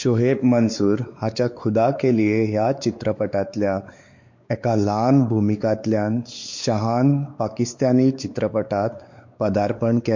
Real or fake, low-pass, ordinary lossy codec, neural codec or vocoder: fake; 7.2 kHz; MP3, 48 kbps; codec, 16 kHz, 4 kbps, X-Codec, WavLM features, trained on Multilingual LibriSpeech